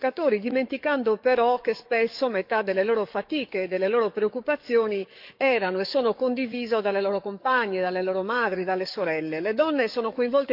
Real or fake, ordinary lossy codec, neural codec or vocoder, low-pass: fake; none; codec, 44.1 kHz, 7.8 kbps, DAC; 5.4 kHz